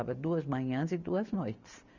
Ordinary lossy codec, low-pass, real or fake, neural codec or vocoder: none; 7.2 kHz; real; none